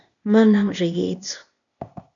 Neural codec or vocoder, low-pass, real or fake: codec, 16 kHz, 0.8 kbps, ZipCodec; 7.2 kHz; fake